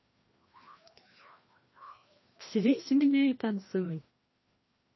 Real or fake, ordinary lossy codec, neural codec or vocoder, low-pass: fake; MP3, 24 kbps; codec, 16 kHz, 1 kbps, FreqCodec, larger model; 7.2 kHz